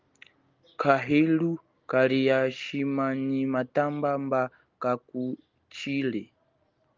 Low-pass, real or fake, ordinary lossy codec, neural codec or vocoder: 7.2 kHz; real; Opus, 24 kbps; none